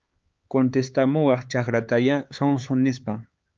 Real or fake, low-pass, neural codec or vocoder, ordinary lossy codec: fake; 7.2 kHz; codec, 16 kHz, 4 kbps, X-Codec, HuBERT features, trained on LibriSpeech; Opus, 24 kbps